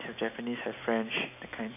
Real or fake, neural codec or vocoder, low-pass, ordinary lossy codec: real; none; 3.6 kHz; none